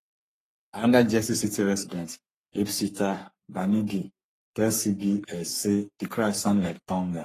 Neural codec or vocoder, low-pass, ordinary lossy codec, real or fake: codec, 44.1 kHz, 3.4 kbps, Pupu-Codec; 14.4 kHz; AAC, 48 kbps; fake